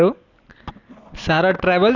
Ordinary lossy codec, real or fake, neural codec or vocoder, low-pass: none; real; none; 7.2 kHz